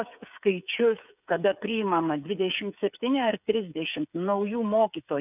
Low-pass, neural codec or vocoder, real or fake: 3.6 kHz; codec, 16 kHz, 8 kbps, FreqCodec, smaller model; fake